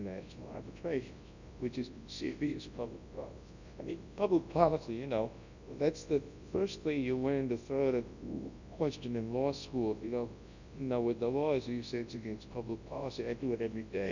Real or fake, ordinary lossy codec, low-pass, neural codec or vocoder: fake; Opus, 64 kbps; 7.2 kHz; codec, 24 kHz, 0.9 kbps, WavTokenizer, large speech release